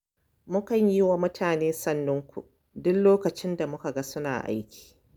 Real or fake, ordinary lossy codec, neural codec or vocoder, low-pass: real; none; none; none